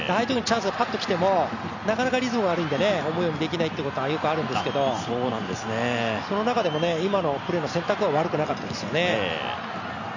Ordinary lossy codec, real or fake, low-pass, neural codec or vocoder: none; real; 7.2 kHz; none